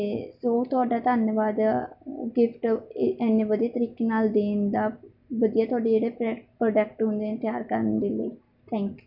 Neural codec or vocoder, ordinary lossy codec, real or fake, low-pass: none; none; real; 5.4 kHz